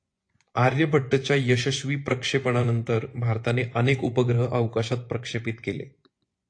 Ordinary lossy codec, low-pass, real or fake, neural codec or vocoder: AAC, 48 kbps; 9.9 kHz; fake; vocoder, 24 kHz, 100 mel bands, Vocos